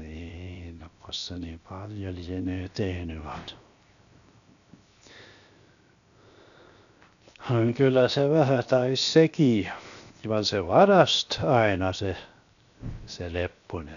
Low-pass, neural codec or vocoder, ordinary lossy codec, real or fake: 7.2 kHz; codec, 16 kHz, 0.7 kbps, FocalCodec; none; fake